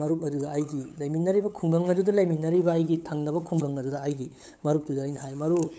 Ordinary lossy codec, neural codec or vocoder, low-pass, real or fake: none; codec, 16 kHz, 8 kbps, FunCodec, trained on LibriTTS, 25 frames a second; none; fake